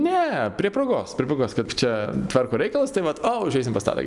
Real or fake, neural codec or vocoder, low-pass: real; none; 10.8 kHz